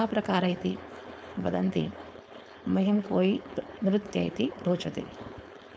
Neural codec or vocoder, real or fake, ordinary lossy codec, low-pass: codec, 16 kHz, 4.8 kbps, FACodec; fake; none; none